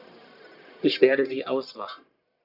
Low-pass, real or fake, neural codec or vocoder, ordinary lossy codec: 5.4 kHz; fake; codec, 44.1 kHz, 1.7 kbps, Pupu-Codec; none